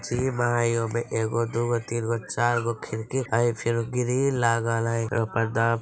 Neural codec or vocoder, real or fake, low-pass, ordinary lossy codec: none; real; none; none